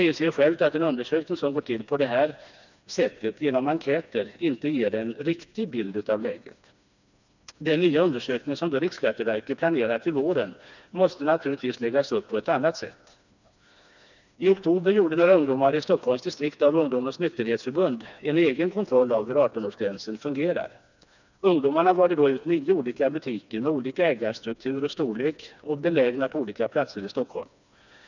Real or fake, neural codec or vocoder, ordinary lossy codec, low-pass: fake; codec, 16 kHz, 2 kbps, FreqCodec, smaller model; none; 7.2 kHz